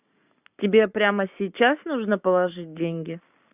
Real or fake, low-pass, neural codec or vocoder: fake; 3.6 kHz; codec, 44.1 kHz, 7.8 kbps, Pupu-Codec